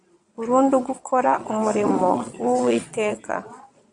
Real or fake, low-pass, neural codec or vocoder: fake; 9.9 kHz; vocoder, 22.05 kHz, 80 mel bands, Vocos